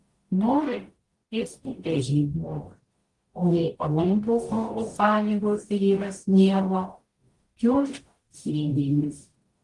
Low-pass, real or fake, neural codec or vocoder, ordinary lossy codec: 10.8 kHz; fake; codec, 44.1 kHz, 0.9 kbps, DAC; Opus, 24 kbps